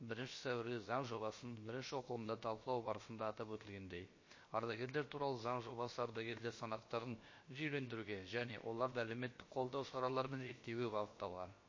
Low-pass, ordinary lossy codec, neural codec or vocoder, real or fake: 7.2 kHz; MP3, 32 kbps; codec, 16 kHz, about 1 kbps, DyCAST, with the encoder's durations; fake